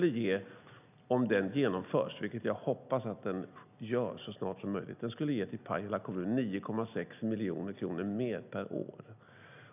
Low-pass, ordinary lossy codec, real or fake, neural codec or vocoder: 3.6 kHz; none; real; none